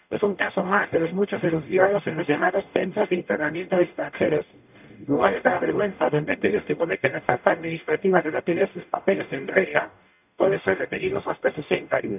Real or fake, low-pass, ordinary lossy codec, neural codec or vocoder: fake; 3.6 kHz; none; codec, 44.1 kHz, 0.9 kbps, DAC